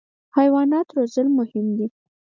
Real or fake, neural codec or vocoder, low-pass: real; none; 7.2 kHz